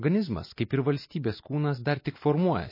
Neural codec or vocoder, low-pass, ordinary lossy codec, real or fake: none; 5.4 kHz; MP3, 24 kbps; real